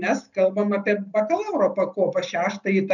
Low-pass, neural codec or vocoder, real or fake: 7.2 kHz; none; real